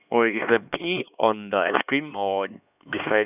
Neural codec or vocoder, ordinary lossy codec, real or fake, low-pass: codec, 16 kHz, 2 kbps, X-Codec, HuBERT features, trained on LibriSpeech; none; fake; 3.6 kHz